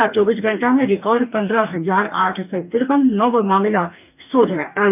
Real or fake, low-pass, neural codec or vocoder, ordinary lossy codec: fake; 3.6 kHz; codec, 44.1 kHz, 2.6 kbps, DAC; none